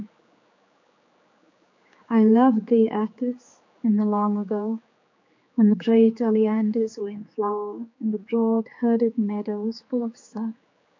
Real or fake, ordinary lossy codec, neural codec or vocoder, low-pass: fake; MP3, 48 kbps; codec, 16 kHz, 4 kbps, X-Codec, HuBERT features, trained on general audio; 7.2 kHz